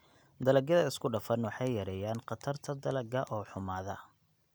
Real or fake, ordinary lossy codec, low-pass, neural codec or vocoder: real; none; none; none